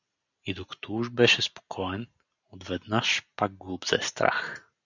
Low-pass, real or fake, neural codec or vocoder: 7.2 kHz; real; none